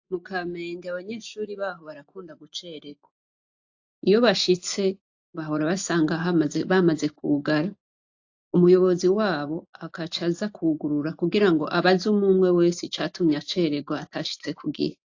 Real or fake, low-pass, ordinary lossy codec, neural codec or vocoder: real; 7.2 kHz; AAC, 48 kbps; none